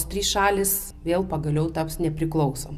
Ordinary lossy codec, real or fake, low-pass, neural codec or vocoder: Opus, 64 kbps; real; 14.4 kHz; none